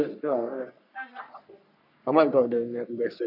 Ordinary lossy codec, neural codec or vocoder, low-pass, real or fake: none; codec, 44.1 kHz, 3.4 kbps, Pupu-Codec; 5.4 kHz; fake